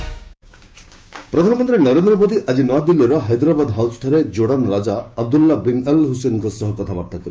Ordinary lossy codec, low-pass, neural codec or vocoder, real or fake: none; none; codec, 16 kHz, 6 kbps, DAC; fake